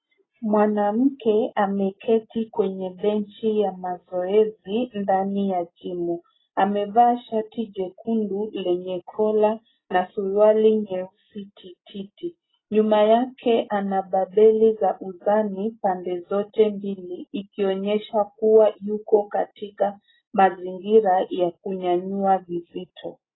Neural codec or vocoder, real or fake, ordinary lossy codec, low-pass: none; real; AAC, 16 kbps; 7.2 kHz